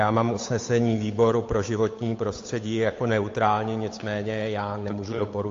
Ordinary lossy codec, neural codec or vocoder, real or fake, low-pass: AAC, 48 kbps; codec, 16 kHz, 8 kbps, FunCodec, trained on Chinese and English, 25 frames a second; fake; 7.2 kHz